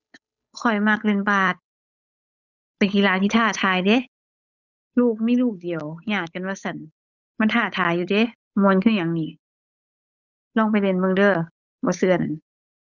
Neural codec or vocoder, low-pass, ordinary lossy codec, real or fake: codec, 16 kHz, 8 kbps, FunCodec, trained on Chinese and English, 25 frames a second; 7.2 kHz; none; fake